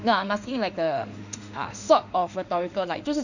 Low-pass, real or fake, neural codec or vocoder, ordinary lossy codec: 7.2 kHz; fake; autoencoder, 48 kHz, 32 numbers a frame, DAC-VAE, trained on Japanese speech; none